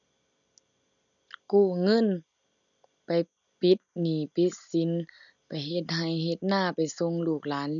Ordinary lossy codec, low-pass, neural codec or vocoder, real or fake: none; 7.2 kHz; none; real